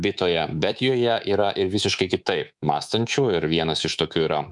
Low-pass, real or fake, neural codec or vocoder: 10.8 kHz; fake; codec, 24 kHz, 3.1 kbps, DualCodec